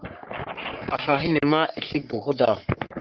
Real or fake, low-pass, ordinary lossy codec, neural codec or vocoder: fake; 7.2 kHz; Opus, 24 kbps; codec, 44.1 kHz, 3.4 kbps, Pupu-Codec